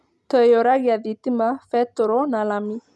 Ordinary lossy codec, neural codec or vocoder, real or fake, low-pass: none; none; real; none